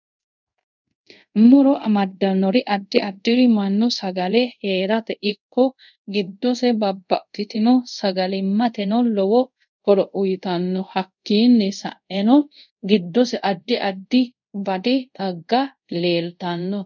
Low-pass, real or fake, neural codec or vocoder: 7.2 kHz; fake; codec, 24 kHz, 0.5 kbps, DualCodec